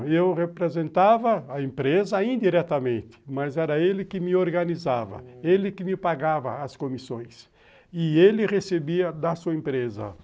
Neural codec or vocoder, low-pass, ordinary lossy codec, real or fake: none; none; none; real